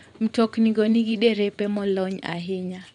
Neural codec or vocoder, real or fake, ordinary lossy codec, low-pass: vocoder, 24 kHz, 100 mel bands, Vocos; fake; none; 10.8 kHz